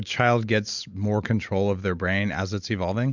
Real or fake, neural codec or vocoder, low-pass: real; none; 7.2 kHz